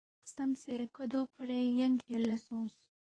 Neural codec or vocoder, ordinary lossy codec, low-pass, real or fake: codec, 24 kHz, 0.9 kbps, WavTokenizer, medium speech release version 2; AAC, 32 kbps; 9.9 kHz; fake